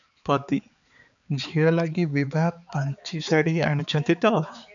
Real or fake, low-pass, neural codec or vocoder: fake; 7.2 kHz; codec, 16 kHz, 4 kbps, X-Codec, HuBERT features, trained on balanced general audio